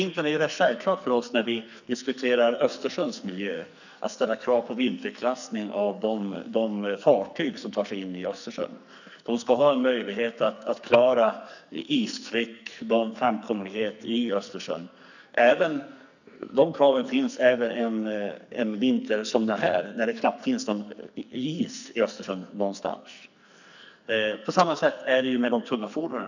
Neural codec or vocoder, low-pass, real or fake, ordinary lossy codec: codec, 44.1 kHz, 2.6 kbps, SNAC; 7.2 kHz; fake; none